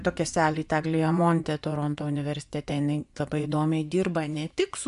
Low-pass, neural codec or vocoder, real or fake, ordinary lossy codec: 10.8 kHz; vocoder, 24 kHz, 100 mel bands, Vocos; fake; AAC, 96 kbps